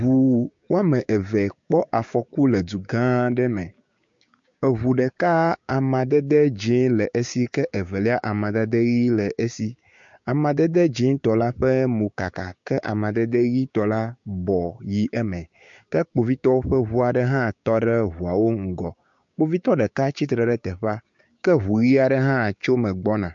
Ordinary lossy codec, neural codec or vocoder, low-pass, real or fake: MP3, 48 kbps; codec, 16 kHz, 6 kbps, DAC; 7.2 kHz; fake